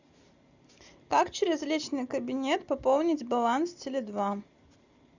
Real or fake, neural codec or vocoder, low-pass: real; none; 7.2 kHz